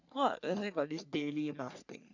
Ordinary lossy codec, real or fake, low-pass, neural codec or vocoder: none; fake; 7.2 kHz; codec, 44.1 kHz, 3.4 kbps, Pupu-Codec